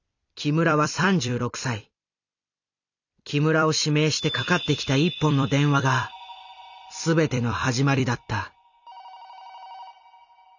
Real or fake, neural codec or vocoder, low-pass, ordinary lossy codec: fake; vocoder, 44.1 kHz, 128 mel bands every 256 samples, BigVGAN v2; 7.2 kHz; none